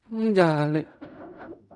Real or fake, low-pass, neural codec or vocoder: fake; 10.8 kHz; codec, 16 kHz in and 24 kHz out, 0.4 kbps, LongCat-Audio-Codec, fine tuned four codebook decoder